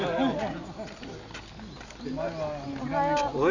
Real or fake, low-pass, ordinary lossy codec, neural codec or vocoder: real; 7.2 kHz; none; none